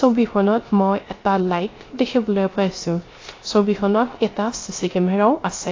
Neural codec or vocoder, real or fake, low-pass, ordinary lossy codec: codec, 16 kHz, 0.3 kbps, FocalCodec; fake; 7.2 kHz; AAC, 32 kbps